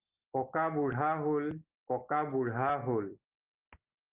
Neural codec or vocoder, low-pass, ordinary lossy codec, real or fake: none; 3.6 kHz; Opus, 32 kbps; real